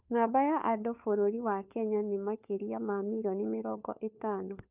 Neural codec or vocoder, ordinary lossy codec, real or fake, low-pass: codec, 16 kHz, 4 kbps, FunCodec, trained on LibriTTS, 50 frames a second; none; fake; 3.6 kHz